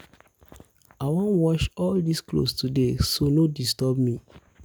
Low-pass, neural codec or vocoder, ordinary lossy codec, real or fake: none; none; none; real